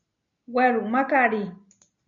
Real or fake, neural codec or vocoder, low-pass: real; none; 7.2 kHz